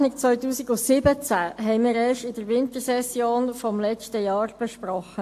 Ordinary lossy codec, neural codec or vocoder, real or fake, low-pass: AAC, 64 kbps; none; real; 14.4 kHz